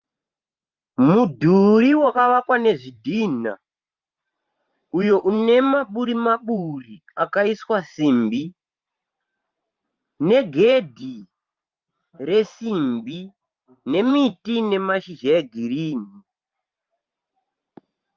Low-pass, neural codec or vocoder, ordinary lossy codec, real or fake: 7.2 kHz; vocoder, 44.1 kHz, 128 mel bands every 512 samples, BigVGAN v2; Opus, 32 kbps; fake